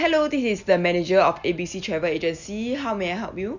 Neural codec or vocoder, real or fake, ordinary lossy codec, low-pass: none; real; none; 7.2 kHz